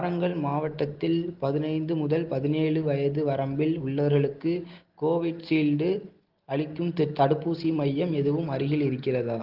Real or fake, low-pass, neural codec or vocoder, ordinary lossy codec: real; 5.4 kHz; none; Opus, 16 kbps